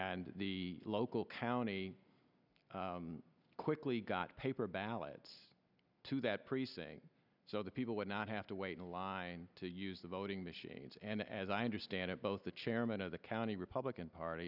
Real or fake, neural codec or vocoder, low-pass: real; none; 5.4 kHz